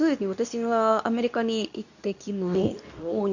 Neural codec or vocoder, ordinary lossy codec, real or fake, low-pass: codec, 24 kHz, 0.9 kbps, WavTokenizer, medium speech release version 2; none; fake; 7.2 kHz